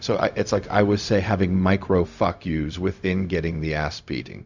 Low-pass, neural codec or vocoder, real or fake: 7.2 kHz; codec, 16 kHz, 0.4 kbps, LongCat-Audio-Codec; fake